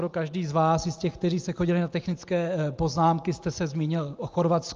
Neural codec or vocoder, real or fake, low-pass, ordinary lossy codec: none; real; 7.2 kHz; Opus, 32 kbps